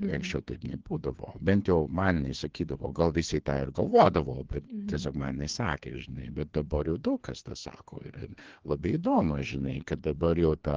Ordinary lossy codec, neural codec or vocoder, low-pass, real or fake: Opus, 16 kbps; codec, 16 kHz, 2 kbps, FreqCodec, larger model; 7.2 kHz; fake